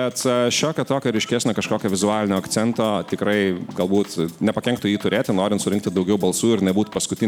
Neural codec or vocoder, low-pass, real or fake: none; 19.8 kHz; real